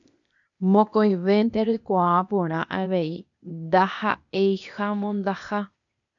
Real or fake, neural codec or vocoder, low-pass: fake; codec, 16 kHz, 0.8 kbps, ZipCodec; 7.2 kHz